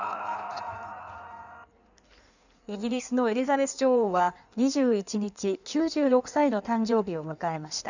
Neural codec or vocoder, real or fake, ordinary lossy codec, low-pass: codec, 16 kHz in and 24 kHz out, 1.1 kbps, FireRedTTS-2 codec; fake; none; 7.2 kHz